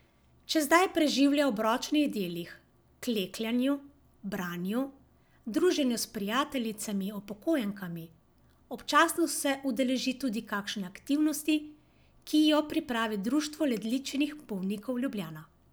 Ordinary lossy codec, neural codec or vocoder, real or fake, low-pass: none; none; real; none